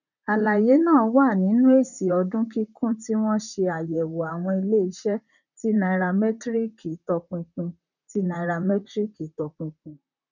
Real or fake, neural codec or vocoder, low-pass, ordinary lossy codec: fake; vocoder, 44.1 kHz, 128 mel bands, Pupu-Vocoder; 7.2 kHz; none